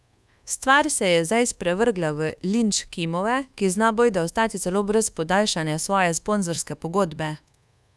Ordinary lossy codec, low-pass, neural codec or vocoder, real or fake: none; none; codec, 24 kHz, 1.2 kbps, DualCodec; fake